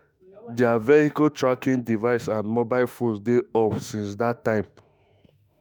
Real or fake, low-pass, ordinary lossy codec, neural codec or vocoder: fake; none; none; autoencoder, 48 kHz, 32 numbers a frame, DAC-VAE, trained on Japanese speech